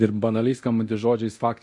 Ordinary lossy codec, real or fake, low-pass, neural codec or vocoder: MP3, 48 kbps; fake; 10.8 kHz; codec, 24 kHz, 0.9 kbps, DualCodec